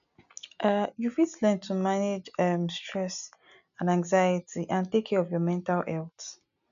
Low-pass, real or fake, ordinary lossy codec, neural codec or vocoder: 7.2 kHz; real; none; none